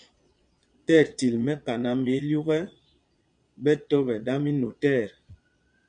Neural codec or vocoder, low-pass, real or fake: vocoder, 22.05 kHz, 80 mel bands, Vocos; 9.9 kHz; fake